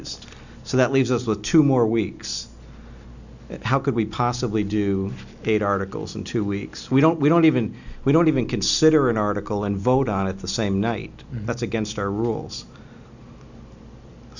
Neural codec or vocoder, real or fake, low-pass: none; real; 7.2 kHz